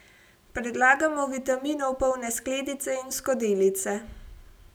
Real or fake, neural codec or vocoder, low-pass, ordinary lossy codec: real; none; none; none